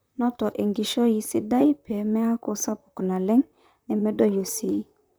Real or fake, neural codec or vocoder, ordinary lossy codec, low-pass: fake; vocoder, 44.1 kHz, 128 mel bands, Pupu-Vocoder; none; none